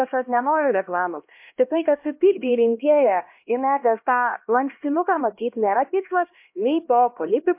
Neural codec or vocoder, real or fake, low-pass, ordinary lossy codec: codec, 16 kHz, 1 kbps, X-Codec, HuBERT features, trained on LibriSpeech; fake; 3.6 kHz; MP3, 32 kbps